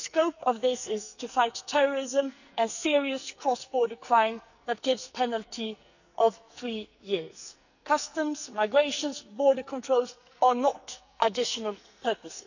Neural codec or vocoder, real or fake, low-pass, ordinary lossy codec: codec, 44.1 kHz, 2.6 kbps, SNAC; fake; 7.2 kHz; none